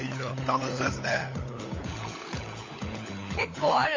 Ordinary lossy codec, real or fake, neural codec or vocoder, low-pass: MP3, 32 kbps; fake; codec, 16 kHz, 16 kbps, FunCodec, trained on LibriTTS, 50 frames a second; 7.2 kHz